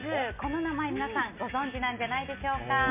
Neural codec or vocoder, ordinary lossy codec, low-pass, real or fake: none; MP3, 32 kbps; 3.6 kHz; real